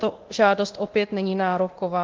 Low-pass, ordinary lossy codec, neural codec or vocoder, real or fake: 7.2 kHz; Opus, 16 kbps; codec, 24 kHz, 0.9 kbps, DualCodec; fake